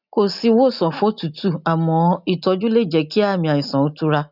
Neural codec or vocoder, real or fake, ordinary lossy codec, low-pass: none; real; none; 5.4 kHz